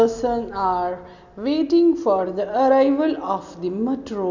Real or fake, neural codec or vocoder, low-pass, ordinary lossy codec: real; none; 7.2 kHz; none